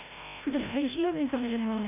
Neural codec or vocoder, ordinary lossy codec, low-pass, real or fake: codec, 16 kHz, 0.5 kbps, FreqCodec, larger model; none; 3.6 kHz; fake